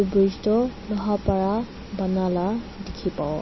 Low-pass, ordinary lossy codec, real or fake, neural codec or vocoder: 7.2 kHz; MP3, 24 kbps; real; none